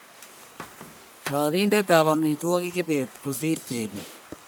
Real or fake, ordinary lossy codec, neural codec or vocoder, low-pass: fake; none; codec, 44.1 kHz, 1.7 kbps, Pupu-Codec; none